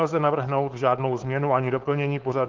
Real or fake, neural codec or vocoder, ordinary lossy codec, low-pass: fake; codec, 16 kHz, 4.8 kbps, FACodec; Opus, 16 kbps; 7.2 kHz